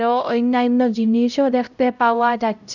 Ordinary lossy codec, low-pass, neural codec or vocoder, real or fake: none; 7.2 kHz; codec, 16 kHz, 0.5 kbps, X-Codec, HuBERT features, trained on LibriSpeech; fake